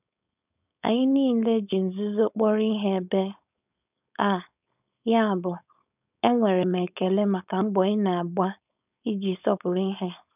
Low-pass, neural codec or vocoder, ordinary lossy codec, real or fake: 3.6 kHz; codec, 16 kHz, 4.8 kbps, FACodec; none; fake